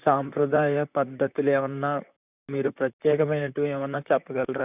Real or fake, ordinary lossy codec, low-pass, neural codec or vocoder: fake; none; 3.6 kHz; vocoder, 44.1 kHz, 128 mel bands, Pupu-Vocoder